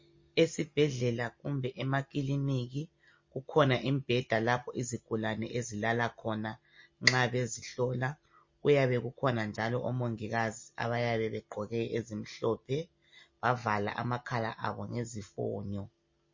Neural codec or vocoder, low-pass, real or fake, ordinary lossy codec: none; 7.2 kHz; real; MP3, 32 kbps